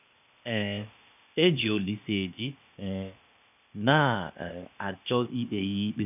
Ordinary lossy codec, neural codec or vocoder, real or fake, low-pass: none; codec, 16 kHz, 0.8 kbps, ZipCodec; fake; 3.6 kHz